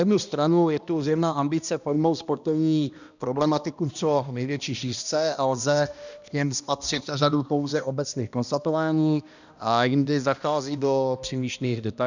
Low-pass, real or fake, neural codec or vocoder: 7.2 kHz; fake; codec, 16 kHz, 1 kbps, X-Codec, HuBERT features, trained on balanced general audio